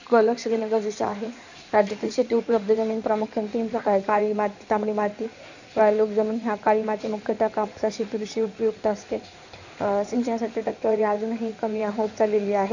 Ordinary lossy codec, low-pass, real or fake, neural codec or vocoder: none; 7.2 kHz; fake; codec, 16 kHz in and 24 kHz out, 2.2 kbps, FireRedTTS-2 codec